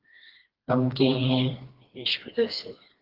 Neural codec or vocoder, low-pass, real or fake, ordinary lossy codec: codec, 16 kHz, 2 kbps, FreqCodec, smaller model; 5.4 kHz; fake; Opus, 16 kbps